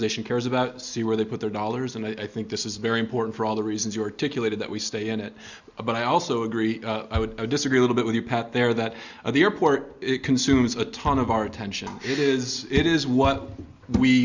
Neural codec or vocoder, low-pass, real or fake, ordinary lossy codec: none; 7.2 kHz; real; Opus, 64 kbps